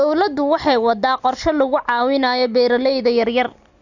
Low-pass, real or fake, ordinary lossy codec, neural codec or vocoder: 7.2 kHz; real; none; none